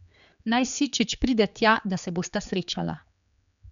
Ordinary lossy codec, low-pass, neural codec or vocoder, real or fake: none; 7.2 kHz; codec, 16 kHz, 4 kbps, X-Codec, HuBERT features, trained on general audio; fake